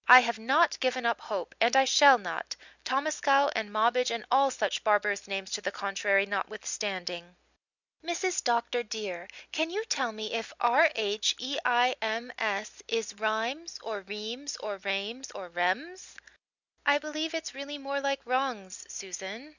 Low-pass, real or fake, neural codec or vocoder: 7.2 kHz; real; none